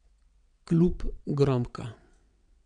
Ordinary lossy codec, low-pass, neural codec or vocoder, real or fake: none; 9.9 kHz; vocoder, 22.05 kHz, 80 mel bands, WaveNeXt; fake